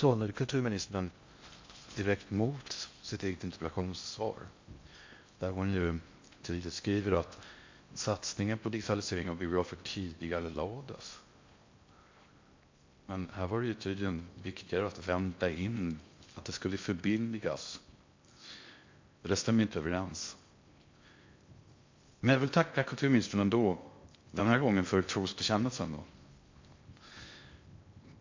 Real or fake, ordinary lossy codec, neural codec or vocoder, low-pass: fake; MP3, 48 kbps; codec, 16 kHz in and 24 kHz out, 0.6 kbps, FocalCodec, streaming, 2048 codes; 7.2 kHz